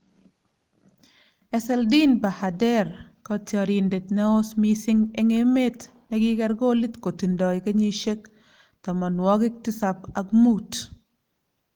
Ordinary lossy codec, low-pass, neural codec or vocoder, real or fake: Opus, 24 kbps; 19.8 kHz; none; real